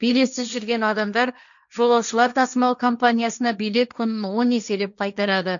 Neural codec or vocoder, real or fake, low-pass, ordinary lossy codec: codec, 16 kHz, 1.1 kbps, Voila-Tokenizer; fake; 7.2 kHz; none